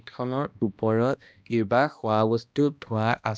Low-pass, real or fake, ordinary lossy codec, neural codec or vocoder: none; fake; none; codec, 16 kHz, 1 kbps, X-Codec, HuBERT features, trained on balanced general audio